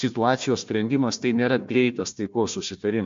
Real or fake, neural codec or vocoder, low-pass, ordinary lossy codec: fake; codec, 16 kHz, 1 kbps, FunCodec, trained on Chinese and English, 50 frames a second; 7.2 kHz; MP3, 48 kbps